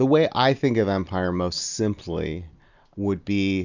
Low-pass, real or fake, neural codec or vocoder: 7.2 kHz; real; none